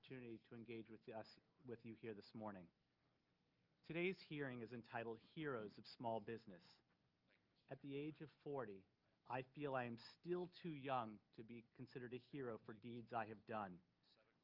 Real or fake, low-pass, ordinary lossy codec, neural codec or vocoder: real; 5.4 kHz; Opus, 24 kbps; none